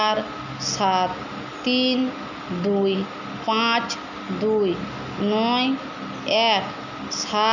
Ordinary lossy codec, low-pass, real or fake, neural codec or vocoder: none; 7.2 kHz; real; none